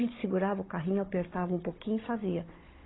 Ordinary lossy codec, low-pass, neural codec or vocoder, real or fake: AAC, 16 kbps; 7.2 kHz; codec, 16 kHz, 16 kbps, FunCodec, trained on Chinese and English, 50 frames a second; fake